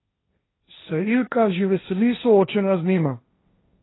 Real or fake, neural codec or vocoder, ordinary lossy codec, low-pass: fake; codec, 16 kHz, 1.1 kbps, Voila-Tokenizer; AAC, 16 kbps; 7.2 kHz